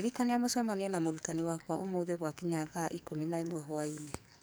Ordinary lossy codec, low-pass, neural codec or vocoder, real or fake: none; none; codec, 44.1 kHz, 2.6 kbps, SNAC; fake